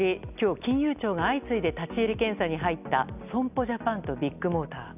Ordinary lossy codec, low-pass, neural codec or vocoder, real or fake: none; 3.6 kHz; none; real